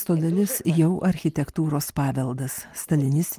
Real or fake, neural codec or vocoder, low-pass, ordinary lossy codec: real; none; 14.4 kHz; Opus, 32 kbps